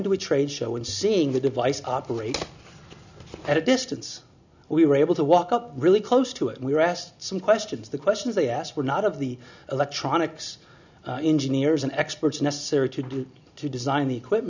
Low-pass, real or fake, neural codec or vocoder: 7.2 kHz; real; none